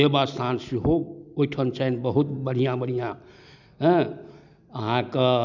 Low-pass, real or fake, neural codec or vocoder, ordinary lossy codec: 7.2 kHz; real; none; none